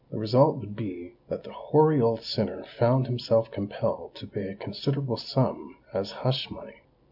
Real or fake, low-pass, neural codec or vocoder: real; 5.4 kHz; none